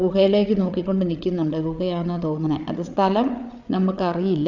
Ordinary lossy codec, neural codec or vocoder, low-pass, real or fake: none; codec, 16 kHz, 8 kbps, FreqCodec, larger model; 7.2 kHz; fake